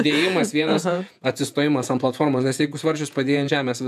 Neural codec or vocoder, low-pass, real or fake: vocoder, 48 kHz, 128 mel bands, Vocos; 14.4 kHz; fake